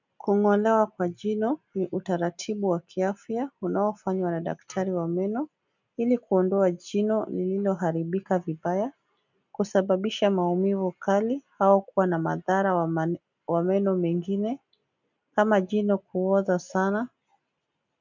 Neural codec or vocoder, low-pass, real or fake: none; 7.2 kHz; real